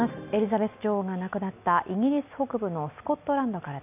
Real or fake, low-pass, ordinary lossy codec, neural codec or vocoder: real; 3.6 kHz; none; none